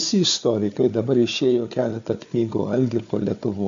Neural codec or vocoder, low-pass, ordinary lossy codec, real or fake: codec, 16 kHz, 4 kbps, FunCodec, trained on Chinese and English, 50 frames a second; 7.2 kHz; MP3, 64 kbps; fake